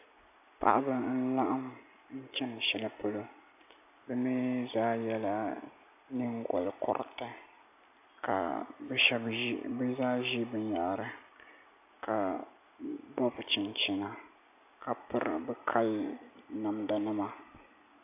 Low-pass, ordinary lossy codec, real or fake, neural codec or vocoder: 3.6 kHz; AAC, 32 kbps; real; none